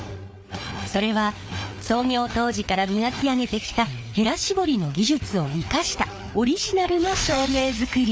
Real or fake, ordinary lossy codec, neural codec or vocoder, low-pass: fake; none; codec, 16 kHz, 4 kbps, FreqCodec, larger model; none